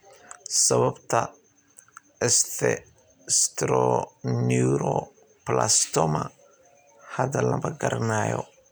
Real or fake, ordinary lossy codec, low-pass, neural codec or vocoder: real; none; none; none